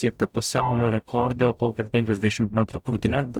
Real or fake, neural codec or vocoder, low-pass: fake; codec, 44.1 kHz, 0.9 kbps, DAC; 19.8 kHz